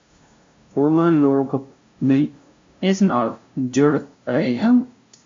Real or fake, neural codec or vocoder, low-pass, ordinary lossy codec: fake; codec, 16 kHz, 0.5 kbps, FunCodec, trained on LibriTTS, 25 frames a second; 7.2 kHz; MP3, 48 kbps